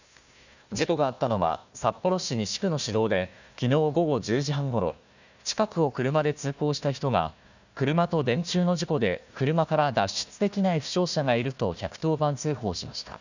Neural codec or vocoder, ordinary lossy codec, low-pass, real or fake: codec, 16 kHz, 1 kbps, FunCodec, trained on Chinese and English, 50 frames a second; none; 7.2 kHz; fake